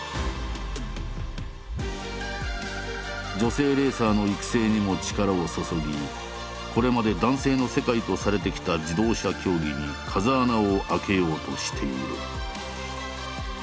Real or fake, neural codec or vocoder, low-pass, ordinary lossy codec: real; none; none; none